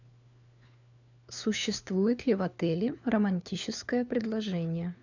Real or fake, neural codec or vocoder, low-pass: fake; codec, 16 kHz, 4 kbps, FunCodec, trained on LibriTTS, 50 frames a second; 7.2 kHz